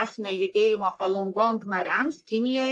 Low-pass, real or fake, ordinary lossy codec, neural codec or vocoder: 10.8 kHz; fake; AAC, 64 kbps; codec, 44.1 kHz, 1.7 kbps, Pupu-Codec